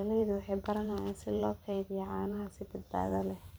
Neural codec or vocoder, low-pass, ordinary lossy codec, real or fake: vocoder, 44.1 kHz, 128 mel bands every 512 samples, BigVGAN v2; none; none; fake